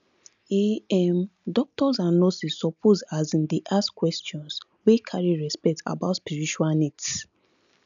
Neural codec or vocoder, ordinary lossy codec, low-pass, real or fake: none; none; 7.2 kHz; real